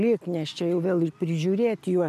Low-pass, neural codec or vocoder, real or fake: 14.4 kHz; none; real